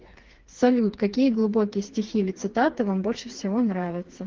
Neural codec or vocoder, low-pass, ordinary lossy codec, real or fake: codec, 16 kHz, 4 kbps, FreqCodec, smaller model; 7.2 kHz; Opus, 16 kbps; fake